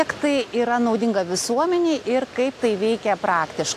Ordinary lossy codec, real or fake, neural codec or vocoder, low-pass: AAC, 64 kbps; real; none; 14.4 kHz